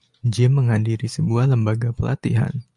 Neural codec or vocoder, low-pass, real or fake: none; 10.8 kHz; real